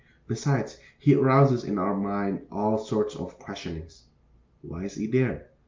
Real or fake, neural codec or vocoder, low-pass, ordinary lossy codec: real; none; 7.2 kHz; Opus, 24 kbps